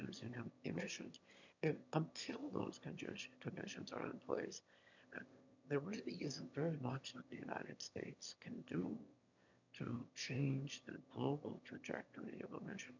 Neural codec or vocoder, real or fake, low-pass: autoencoder, 22.05 kHz, a latent of 192 numbers a frame, VITS, trained on one speaker; fake; 7.2 kHz